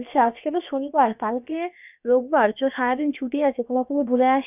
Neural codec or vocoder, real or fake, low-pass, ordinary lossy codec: codec, 16 kHz, about 1 kbps, DyCAST, with the encoder's durations; fake; 3.6 kHz; none